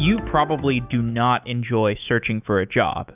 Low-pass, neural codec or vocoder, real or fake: 3.6 kHz; autoencoder, 48 kHz, 128 numbers a frame, DAC-VAE, trained on Japanese speech; fake